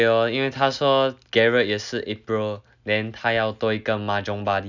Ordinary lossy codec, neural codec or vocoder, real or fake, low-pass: none; none; real; 7.2 kHz